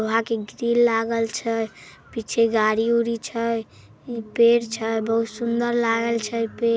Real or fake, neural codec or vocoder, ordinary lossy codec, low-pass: real; none; none; none